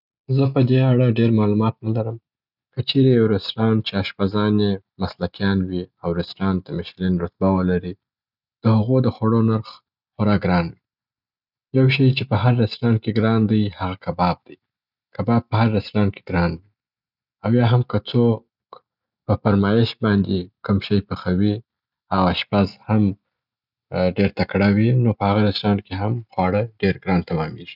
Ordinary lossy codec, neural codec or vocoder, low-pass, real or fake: none; none; 5.4 kHz; real